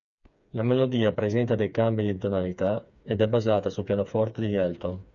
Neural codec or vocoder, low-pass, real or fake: codec, 16 kHz, 4 kbps, FreqCodec, smaller model; 7.2 kHz; fake